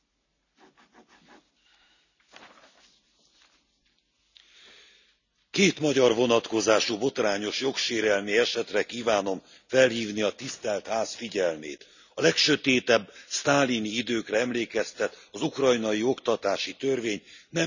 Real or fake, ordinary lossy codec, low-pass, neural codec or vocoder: real; none; 7.2 kHz; none